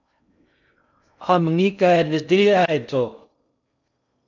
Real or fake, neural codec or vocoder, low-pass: fake; codec, 16 kHz in and 24 kHz out, 0.6 kbps, FocalCodec, streaming, 2048 codes; 7.2 kHz